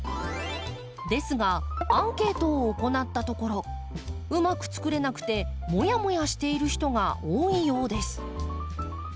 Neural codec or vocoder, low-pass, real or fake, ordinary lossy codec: none; none; real; none